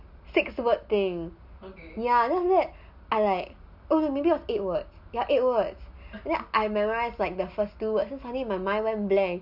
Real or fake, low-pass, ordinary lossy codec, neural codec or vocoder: real; 5.4 kHz; none; none